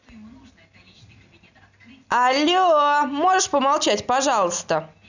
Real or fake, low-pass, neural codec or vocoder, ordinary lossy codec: real; 7.2 kHz; none; none